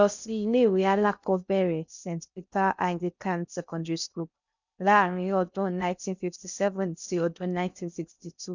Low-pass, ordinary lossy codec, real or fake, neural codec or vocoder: 7.2 kHz; none; fake; codec, 16 kHz in and 24 kHz out, 0.6 kbps, FocalCodec, streaming, 2048 codes